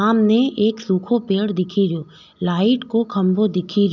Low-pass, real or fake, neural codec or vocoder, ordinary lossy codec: 7.2 kHz; real; none; none